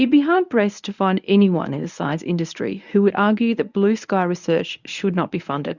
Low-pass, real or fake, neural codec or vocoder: 7.2 kHz; fake; codec, 24 kHz, 0.9 kbps, WavTokenizer, medium speech release version 1